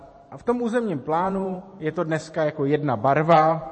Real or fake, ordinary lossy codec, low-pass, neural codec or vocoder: fake; MP3, 32 kbps; 10.8 kHz; vocoder, 44.1 kHz, 128 mel bands every 512 samples, BigVGAN v2